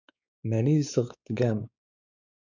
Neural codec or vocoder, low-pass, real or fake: codec, 16 kHz, 4 kbps, X-Codec, WavLM features, trained on Multilingual LibriSpeech; 7.2 kHz; fake